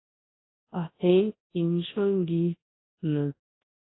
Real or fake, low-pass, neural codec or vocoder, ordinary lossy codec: fake; 7.2 kHz; codec, 24 kHz, 0.9 kbps, WavTokenizer, large speech release; AAC, 16 kbps